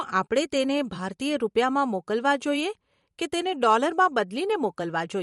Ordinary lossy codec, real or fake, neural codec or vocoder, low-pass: MP3, 48 kbps; fake; vocoder, 44.1 kHz, 128 mel bands every 512 samples, BigVGAN v2; 19.8 kHz